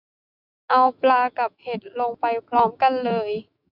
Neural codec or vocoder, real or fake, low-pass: autoencoder, 48 kHz, 128 numbers a frame, DAC-VAE, trained on Japanese speech; fake; 5.4 kHz